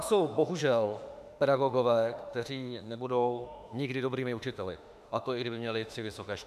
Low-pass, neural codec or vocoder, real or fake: 14.4 kHz; autoencoder, 48 kHz, 32 numbers a frame, DAC-VAE, trained on Japanese speech; fake